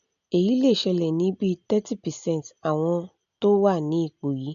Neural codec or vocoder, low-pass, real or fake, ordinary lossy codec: none; 7.2 kHz; real; none